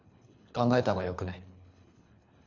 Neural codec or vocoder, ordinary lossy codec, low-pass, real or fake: codec, 24 kHz, 3 kbps, HILCodec; none; 7.2 kHz; fake